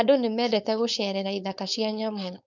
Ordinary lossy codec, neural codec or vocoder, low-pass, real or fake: none; codec, 16 kHz, 4.8 kbps, FACodec; 7.2 kHz; fake